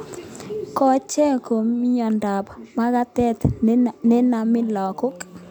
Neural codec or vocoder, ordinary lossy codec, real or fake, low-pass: none; none; real; 19.8 kHz